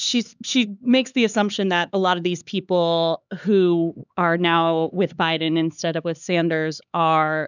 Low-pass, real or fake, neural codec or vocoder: 7.2 kHz; fake; codec, 16 kHz, 4 kbps, X-Codec, HuBERT features, trained on LibriSpeech